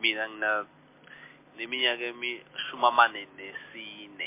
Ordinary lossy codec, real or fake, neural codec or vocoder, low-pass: MP3, 24 kbps; real; none; 3.6 kHz